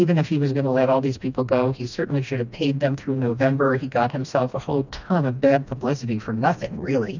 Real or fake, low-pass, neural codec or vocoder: fake; 7.2 kHz; codec, 16 kHz, 1 kbps, FreqCodec, smaller model